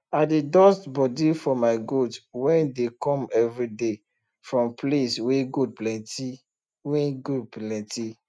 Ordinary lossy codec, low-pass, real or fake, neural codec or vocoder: none; none; real; none